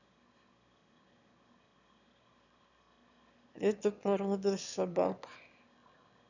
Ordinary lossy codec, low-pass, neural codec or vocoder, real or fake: none; 7.2 kHz; autoencoder, 22.05 kHz, a latent of 192 numbers a frame, VITS, trained on one speaker; fake